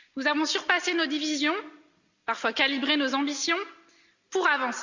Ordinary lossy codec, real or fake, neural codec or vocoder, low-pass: Opus, 64 kbps; real; none; 7.2 kHz